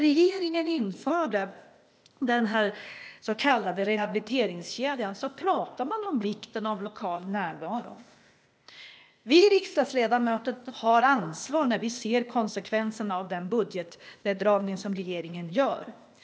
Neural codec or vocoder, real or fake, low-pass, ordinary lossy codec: codec, 16 kHz, 0.8 kbps, ZipCodec; fake; none; none